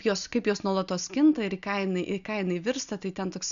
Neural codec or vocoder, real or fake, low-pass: none; real; 7.2 kHz